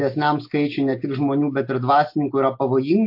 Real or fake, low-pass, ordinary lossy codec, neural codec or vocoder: real; 5.4 kHz; MP3, 32 kbps; none